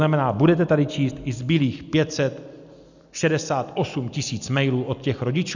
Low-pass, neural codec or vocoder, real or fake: 7.2 kHz; none; real